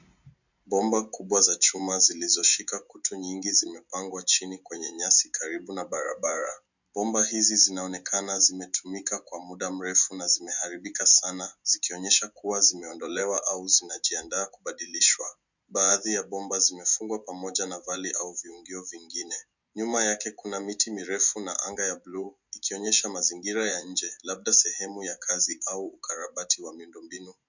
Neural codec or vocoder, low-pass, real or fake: none; 7.2 kHz; real